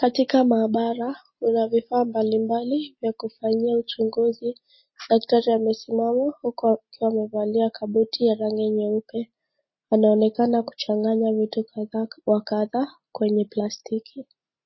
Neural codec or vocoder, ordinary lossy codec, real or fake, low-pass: none; MP3, 24 kbps; real; 7.2 kHz